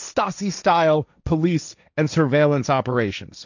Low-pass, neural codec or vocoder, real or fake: 7.2 kHz; codec, 16 kHz, 1.1 kbps, Voila-Tokenizer; fake